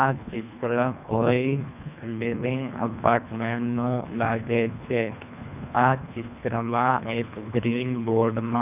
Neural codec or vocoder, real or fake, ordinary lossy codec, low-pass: codec, 24 kHz, 1.5 kbps, HILCodec; fake; none; 3.6 kHz